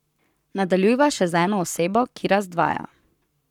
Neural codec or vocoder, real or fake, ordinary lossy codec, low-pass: codec, 44.1 kHz, 7.8 kbps, Pupu-Codec; fake; none; 19.8 kHz